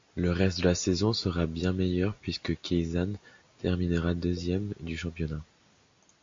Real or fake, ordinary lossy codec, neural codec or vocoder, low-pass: real; MP3, 48 kbps; none; 7.2 kHz